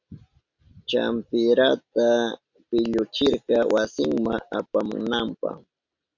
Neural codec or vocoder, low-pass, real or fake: none; 7.2 kHz; real